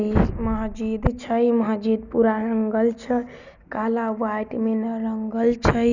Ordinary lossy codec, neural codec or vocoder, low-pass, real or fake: none; none; 7.2 kHz; real